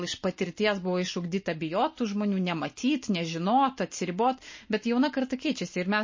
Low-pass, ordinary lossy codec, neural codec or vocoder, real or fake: 7.2 kHz; MP3, 32 kbps; none; real